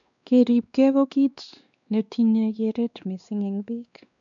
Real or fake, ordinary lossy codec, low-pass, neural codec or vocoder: fake; none; 7.2 kHz; codec, 16 kHz, 2 kbps, X-Codec, WavLM features, trained on Multilingual LibriSpeech